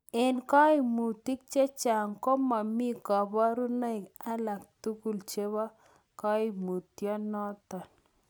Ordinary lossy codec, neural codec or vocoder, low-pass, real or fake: none; none; none; real